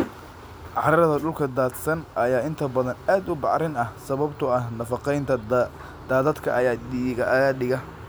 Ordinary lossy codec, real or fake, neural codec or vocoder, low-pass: none; real; none; none